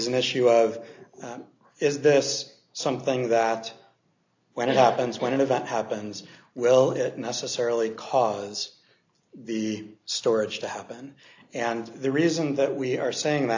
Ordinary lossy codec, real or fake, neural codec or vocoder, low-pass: AAC, 48 kbps; real; none; 7.2 kHz